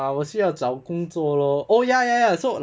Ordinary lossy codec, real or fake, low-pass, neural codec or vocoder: none; real; none; none